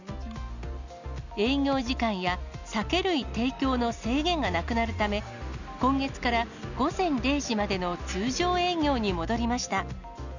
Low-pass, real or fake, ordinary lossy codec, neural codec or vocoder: 7.2 kHz; real; none; none